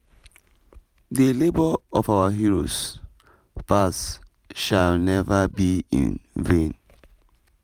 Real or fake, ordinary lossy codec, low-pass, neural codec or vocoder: fake; none; 19.8 kHz; vocoder, 44.1 kHz, 128 mel bands every 512 samples, BigVGAN v2